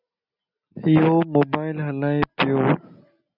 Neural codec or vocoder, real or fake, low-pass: none; real; 5.4 kHz